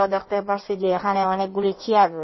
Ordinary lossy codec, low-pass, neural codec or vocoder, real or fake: MP3, 24 kbps; 7.2 kHz; codec, 16 kHz in and 24 kHz out, 1.1 kbps, FireRedTTS-2 codec; fake